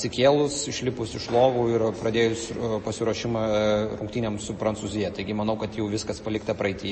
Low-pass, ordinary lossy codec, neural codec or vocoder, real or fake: 10.8 kHz; MP3, 32 kbps; none; real